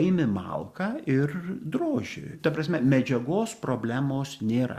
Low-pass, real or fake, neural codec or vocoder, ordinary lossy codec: 14.4 kHz; real; none; Opus, 64 kbps